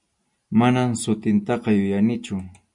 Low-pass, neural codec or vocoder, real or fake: 10.8 kHz; none; real